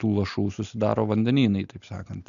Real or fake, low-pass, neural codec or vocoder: real; 7.2 kHz; none